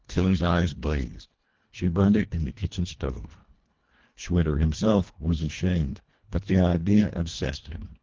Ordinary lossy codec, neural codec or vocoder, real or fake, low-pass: Opus, 16 kbps; codec, 24 kHz, 1.5 kbps, HILCodec; fake; 7.2 kHz